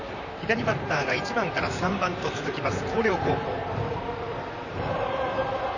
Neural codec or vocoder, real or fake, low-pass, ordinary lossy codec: vocoder, 44.1 kHz, 128 mel bands, Pupu-Vocoder; fake; 7.2 kHz; none